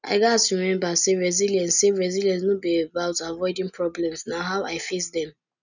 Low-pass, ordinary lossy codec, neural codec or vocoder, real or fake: 7.2 kHz; none; none; real